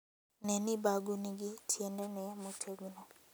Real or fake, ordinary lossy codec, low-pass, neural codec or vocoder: real; none; none; none